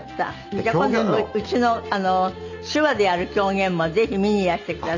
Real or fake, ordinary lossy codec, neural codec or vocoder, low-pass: real; none; none; 7.2 kHz